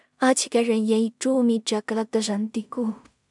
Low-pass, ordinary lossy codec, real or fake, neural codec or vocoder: 10.8 kHz; MP3, 96 kbps; fake; codec, 16 kHz in and 24 kHz out, 0.4 kbps, LongCat-Audio-Codec, two codebook decoder